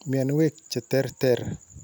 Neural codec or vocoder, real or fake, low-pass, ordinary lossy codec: none; real; none; none